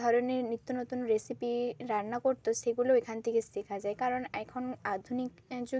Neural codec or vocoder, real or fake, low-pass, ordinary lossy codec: none; real; none; none